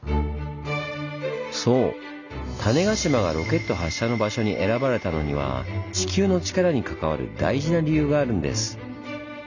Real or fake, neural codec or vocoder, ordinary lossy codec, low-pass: real; none; none; 7.2 kHz